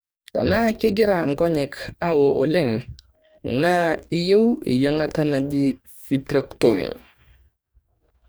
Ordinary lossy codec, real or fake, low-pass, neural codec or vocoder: none; fake; none; codec, 44.1 kHz, 2.6 kbps, DAC